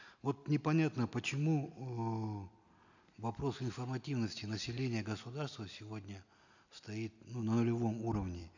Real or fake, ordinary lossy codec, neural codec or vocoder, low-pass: real; none; none; 7.2 kHz